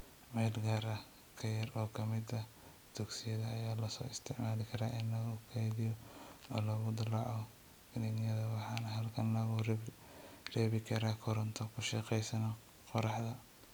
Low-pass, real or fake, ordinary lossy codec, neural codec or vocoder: none; real; none; none